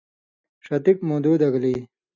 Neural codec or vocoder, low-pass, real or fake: none; 7.2 kHz; real